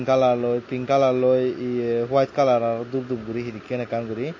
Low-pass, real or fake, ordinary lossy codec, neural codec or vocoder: 7.2 kHz; real; MP3, 32 kbps; none